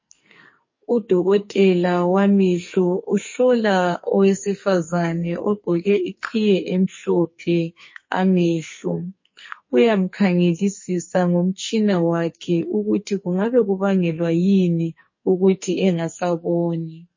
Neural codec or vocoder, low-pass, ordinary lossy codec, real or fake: codec, 44.1 kHz, 2.6 kbps, SNAC; 7.2 kHz; MP3, 32 kbps; fake